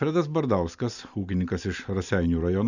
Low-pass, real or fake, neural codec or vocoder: 7.2 kHz; real; none